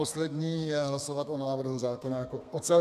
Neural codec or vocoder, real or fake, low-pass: codec, 32 kHz, 1.9 kbps, SNAC; fake; 14.4 kHz